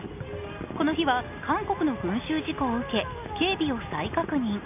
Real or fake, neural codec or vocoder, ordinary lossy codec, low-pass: real; none; none; 3.6 kHz